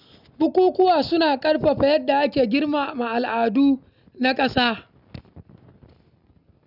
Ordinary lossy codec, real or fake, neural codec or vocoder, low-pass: none; real; none; 5.4 kHz